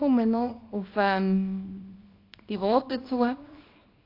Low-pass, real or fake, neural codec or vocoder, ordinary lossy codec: 5.4 kHz; fake; codec, 24 kHz, 0.9 kbps, WavTokenizer, small release; AAC, 32 kbps